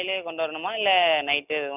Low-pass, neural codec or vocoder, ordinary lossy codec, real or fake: 3.6 kHz; none; none; real